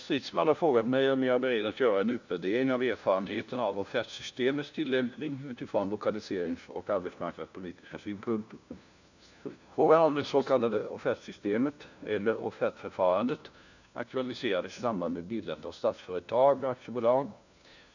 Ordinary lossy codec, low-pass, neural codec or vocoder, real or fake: none; 7.2 kHz; codec, 16 kHz, 1 kbps, FunCodec, trained on LibriTTS, 50 frames a second; fake